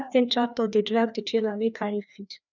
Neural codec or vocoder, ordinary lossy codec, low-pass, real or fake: codec, 16 kHz, 2 kbps, FreqCodec, larger model; none; 7.2 kHz; fake